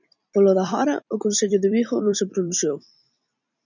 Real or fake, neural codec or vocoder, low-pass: fake; vocoder, 44.1 kHz, 128 mel bands every 256 samples, BigVGAN v2; 7.2 kHz